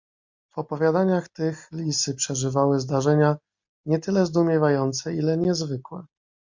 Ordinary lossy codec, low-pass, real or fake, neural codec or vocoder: MP3, 48 kbps; 7.2 kHz; real; none